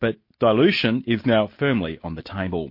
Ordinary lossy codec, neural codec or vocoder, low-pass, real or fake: MP3, 32 kbps; none; 5.4 kHz; real